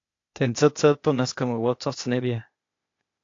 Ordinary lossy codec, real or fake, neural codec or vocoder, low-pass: AAC, 48 kbps; fake; codec, 16 kHz, 0.8 kbps, ZipCodec; 7.2 kHz